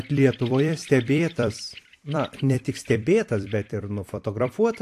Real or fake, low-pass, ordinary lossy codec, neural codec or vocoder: fake; 14.4 kHz; AAC, 64 kbps; vocoder, 44.1 kHz, 128 mel bands every 256 samples, BigVGAN v2